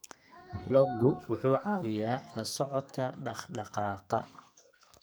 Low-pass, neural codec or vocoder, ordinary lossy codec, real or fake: none; codec, 44.1 kHz, 2.6 kbps, SNAC; none; fake